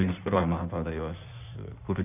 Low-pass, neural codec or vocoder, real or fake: 3.6 kHz; codec, 16 kHz in and 24 kHz out, 1.1 kbps, FireRedTTS-2 codec; fake